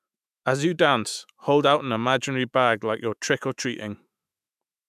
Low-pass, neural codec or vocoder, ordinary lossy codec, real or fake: 14.4 kHz; autoencoder, 48 kHz, 128 numbers a frame, DAC-VAE, trained on Japanese speech; none; fake